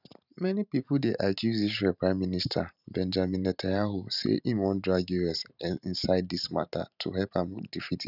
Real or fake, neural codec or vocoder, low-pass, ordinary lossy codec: fake; vocoder, 44.1 kHz, 128 mel bands every 512 samples, BigVGAN v2; 5.4 kHz; none